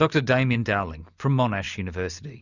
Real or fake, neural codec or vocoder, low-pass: real; none; 7.2 kHz